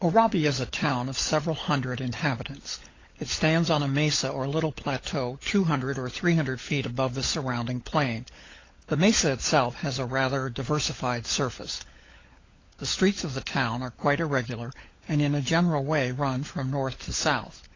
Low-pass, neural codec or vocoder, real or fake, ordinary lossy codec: 7.2 kHz; codec, 16 kHz, 16 kbps, FunCodec, trained on LibriTTS, 50 frames a second; fake; AAC, 32 kbps